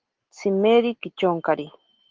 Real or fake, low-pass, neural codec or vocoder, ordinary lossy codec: real; 7.2 kHz; none; Opus, 16 kbps